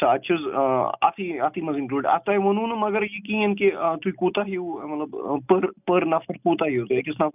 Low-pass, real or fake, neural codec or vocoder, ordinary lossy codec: 3.6 kHz; real; none; none